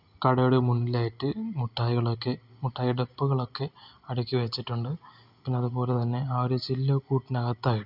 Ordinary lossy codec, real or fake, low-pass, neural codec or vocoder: none; real; 5.4 kHz; none